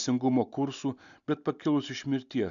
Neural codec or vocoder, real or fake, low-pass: none; real; 7.2 kHz